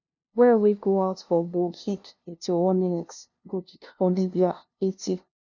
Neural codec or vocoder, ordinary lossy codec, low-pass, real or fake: codec, 16 kHz, 0.5 kbps, FunCodec, trained on LibriTTS, 25 frames a second; none; 7.2 kHz; fake